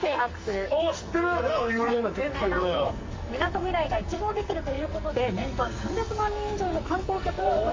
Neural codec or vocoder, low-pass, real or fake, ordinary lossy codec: codec, 44.1 kHz, 2.6 kbps, SNAC; 7.2 kHz; fake; MP3, 32 kbps